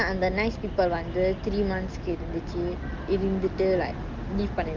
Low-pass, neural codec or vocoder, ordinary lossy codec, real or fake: 7.2 kHz; none; Opus, 32 kbps; real